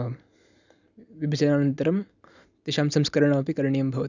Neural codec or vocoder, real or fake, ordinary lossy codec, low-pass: none; real; none; 7.2 kHz